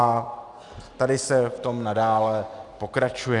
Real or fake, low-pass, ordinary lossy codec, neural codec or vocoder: real; 10.8 kHz; Opus, 64 kbps; none